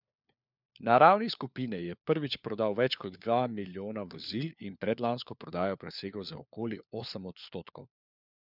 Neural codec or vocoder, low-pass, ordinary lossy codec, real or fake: codec, 16 kHz, 4 kbps, FunCodec, trained on LibriTTS, 50 frames a second; 5.4 kHz; none; fake